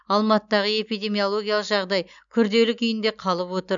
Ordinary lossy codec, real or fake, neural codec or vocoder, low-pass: none; real; none; 7.2 kHz